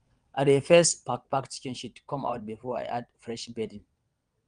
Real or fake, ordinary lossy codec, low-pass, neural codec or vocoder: fake; Opus, 32 kbps; 9.9 kHz; vocoder, 22.05 kHz, 80 mel bands, WaveNeXt